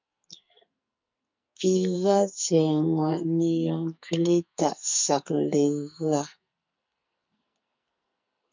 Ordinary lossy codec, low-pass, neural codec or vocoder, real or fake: MP3, 64 kbps; 7.2 kHz; codec, 44.1 kHz, 2.6 kbps, SNAC; fake